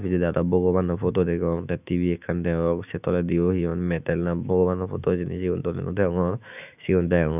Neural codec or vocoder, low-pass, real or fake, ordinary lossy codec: autoencoder, 48 kHz, 128 numbers a frame, DAC-VAE, trained on Japanese speech; 3.6 kHz; fake; none